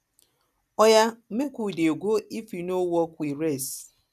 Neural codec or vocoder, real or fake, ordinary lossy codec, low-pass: none; real; none; 14.4 kHz